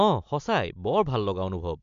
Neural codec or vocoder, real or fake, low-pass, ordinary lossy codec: none; real; 7.2 kHz; MP3, 64 kbps